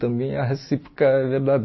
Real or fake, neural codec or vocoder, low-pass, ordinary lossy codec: real; none; 7.2 kHz; MP3, 24 kbps